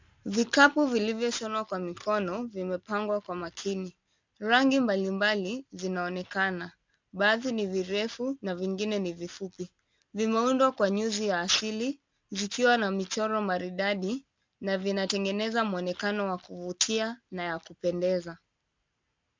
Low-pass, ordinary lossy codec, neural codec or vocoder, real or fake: 7.2 kHz; MP3, 64 kbps; none; real